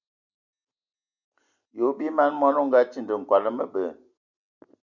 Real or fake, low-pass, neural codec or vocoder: real; 7.2 kHz; none